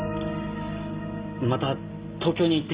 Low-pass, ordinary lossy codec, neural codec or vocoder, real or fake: 3.6 kHz; Opus, 32 kbps; none; real